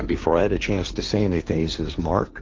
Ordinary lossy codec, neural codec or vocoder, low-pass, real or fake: Opus, 32 kbps; codec, 16 kHz, 1.1 kbps, Voila-Tokenizer; 7.2 kHz; fake